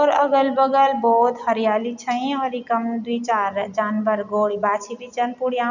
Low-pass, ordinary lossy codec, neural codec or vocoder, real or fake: 7.2 kHz; none; none; real